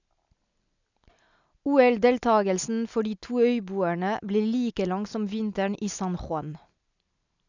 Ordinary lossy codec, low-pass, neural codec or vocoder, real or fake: none; 7.2 kHz; none; real